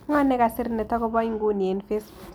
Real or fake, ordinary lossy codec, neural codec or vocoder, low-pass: real; none; none; none